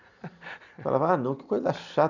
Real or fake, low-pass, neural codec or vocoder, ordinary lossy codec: real; 7.2 kHz; none; none